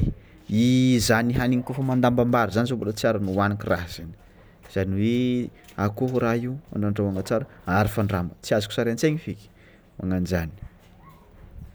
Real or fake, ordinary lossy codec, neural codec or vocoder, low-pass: real; none; none; none